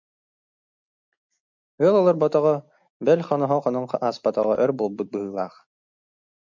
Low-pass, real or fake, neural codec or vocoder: 7.2 kHz; real; none